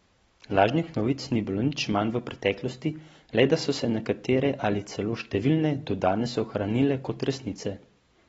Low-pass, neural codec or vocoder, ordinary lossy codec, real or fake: 9.9 kHz; none; AAC, 24 kbps; real